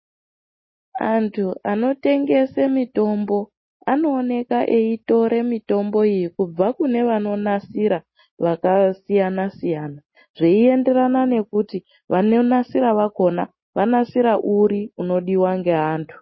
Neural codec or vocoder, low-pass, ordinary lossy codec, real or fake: none; 7.2 kHz; MP3, 24 kbps; real